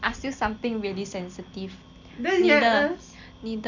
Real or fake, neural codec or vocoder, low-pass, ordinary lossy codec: real; none; 7.2 kHz; none